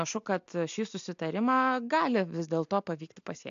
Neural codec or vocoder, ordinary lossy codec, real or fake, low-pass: none; AAC, 64 kbps; real; 7.2 kHz